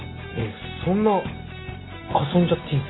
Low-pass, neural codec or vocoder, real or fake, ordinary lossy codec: 7.2 kHz; none; real; AAC, 16 kbps